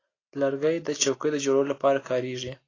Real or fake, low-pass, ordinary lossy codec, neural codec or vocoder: real; 7.2 kHz; AAC, 32 kbps; none